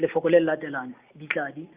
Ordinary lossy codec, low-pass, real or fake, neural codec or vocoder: Opus, 64 kbps; 3.6 kHz; real; none